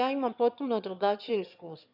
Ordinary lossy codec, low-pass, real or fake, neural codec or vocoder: none; 5.4 kHz; fake; autoencoder, 22.05 kHz, a latent of 192 numbers a frame, VITS, trained on one speaker